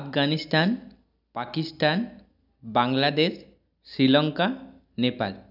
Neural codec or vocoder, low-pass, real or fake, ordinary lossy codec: none; 5.4 kHz; real; none